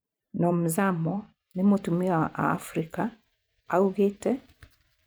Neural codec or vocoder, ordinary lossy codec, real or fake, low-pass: vocoder, 44.1 kHz, 128 mel bands every 512 samples, BigVGAN v2; none; fake; none